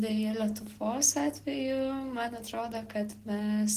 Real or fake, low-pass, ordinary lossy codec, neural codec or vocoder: real; 14.4 kHz; Opus, 16 kbps; none